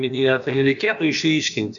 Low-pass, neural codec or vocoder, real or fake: 7.2 kHz; codec, 16 kHz, about 1 kbps, DyCAST, with the encoder's durations; fake